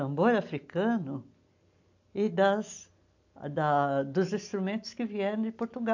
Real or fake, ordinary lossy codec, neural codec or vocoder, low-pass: real; AAC, 48 kbps; none; 7.2 kHz